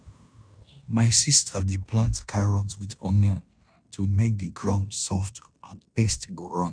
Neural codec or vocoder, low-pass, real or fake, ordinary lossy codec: codec, 16 kHz in and 24 kHz out, 0.9 kbps, LongCat-Audio-Codec, fine tuned four codebook decoder; 9.9 kHz; fake; none